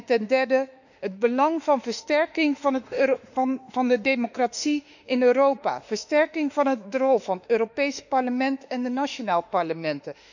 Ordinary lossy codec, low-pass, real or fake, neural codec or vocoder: none; 7.2 kHz; fake; autoencoder, 48 kHz, 32 numbers a frame, DAC-VAE, trained on Japanese speech